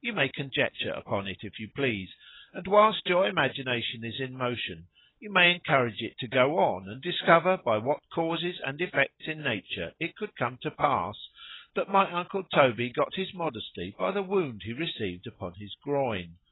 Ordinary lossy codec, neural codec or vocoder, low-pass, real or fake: AAC, 16 kbps; none; 7.2 kHz; real